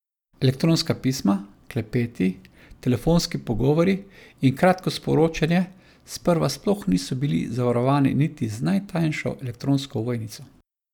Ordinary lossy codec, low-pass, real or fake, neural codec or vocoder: none; 19.8 kHz; real; none